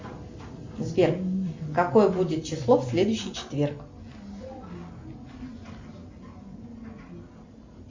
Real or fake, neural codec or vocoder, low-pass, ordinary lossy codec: real; none; 7.2 kHz; MP3, 64 kbps